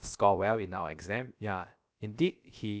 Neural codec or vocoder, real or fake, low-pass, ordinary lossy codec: codec, 16 kHz, about 1 kbps, DyCAST, with the encoder's durations; fake; none; none